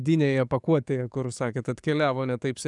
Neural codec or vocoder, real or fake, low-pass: codec, 44.1 kHz, 7.8 kbps, DAC; fake; 10.8 kHz